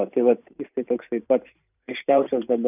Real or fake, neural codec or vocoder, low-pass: fake; vocoder, 44.1 kHz, 128 mel bands every 512 samples, BigVGAN v2; 3.6 kHz